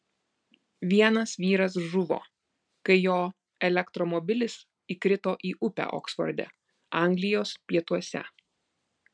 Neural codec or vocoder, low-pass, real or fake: none; 9.9 kHz; real